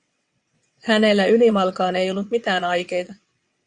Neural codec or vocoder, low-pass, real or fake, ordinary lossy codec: vocoder, 22.05 kHz, 80 mel bands, WaveNeXt; 9.9 kHz; fake; Opus, 64 kbps